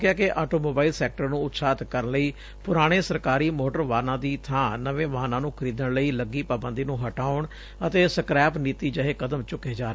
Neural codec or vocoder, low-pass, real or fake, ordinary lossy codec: none; none; real; none